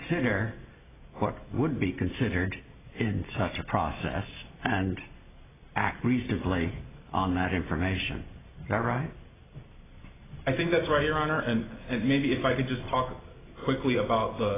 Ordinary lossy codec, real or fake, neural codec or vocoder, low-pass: AAC, 16 kbps; real; none; 3.6 kHz